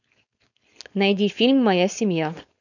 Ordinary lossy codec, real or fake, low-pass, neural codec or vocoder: none; fake; 7.2 kHz; codec, 16 kHz, 4.8 kbps, FACodec